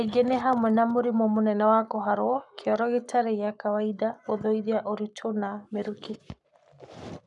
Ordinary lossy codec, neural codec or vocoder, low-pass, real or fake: none; codec, 44.1 kHz, 7.8 kbps, Pupu-Codec; 10.8 kHz; fake